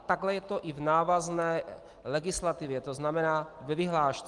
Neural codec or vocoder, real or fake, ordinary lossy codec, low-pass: none; real; Opus, 24 kbps; 10.8 kHz